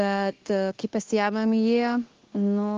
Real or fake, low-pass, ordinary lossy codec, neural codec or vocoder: fake; 7.2 kHz; Opus, 16 kbps; codec, 16 kHz, 0.9 kbps, LongCat-Audio-Codec